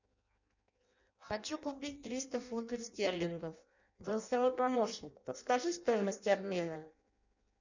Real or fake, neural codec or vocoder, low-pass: fake; codec, 16 kHz in and 24 kHz out, 0.6 kbps, FireRedTTS-2 codec; 7.2 kHz